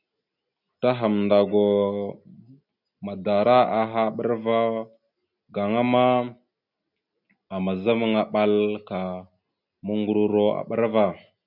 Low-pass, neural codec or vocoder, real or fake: 5.4 kHz; none; real